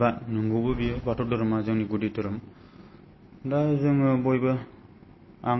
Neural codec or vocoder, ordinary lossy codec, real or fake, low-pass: none; MP3, 24 kbps; real; 7.2 kHz